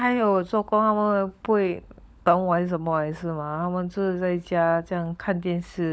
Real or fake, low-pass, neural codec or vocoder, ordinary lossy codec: fake; none; codec, 16 kHz, 16 kbps, FunCodec, trained on LibriTTS, 50 frames a second; none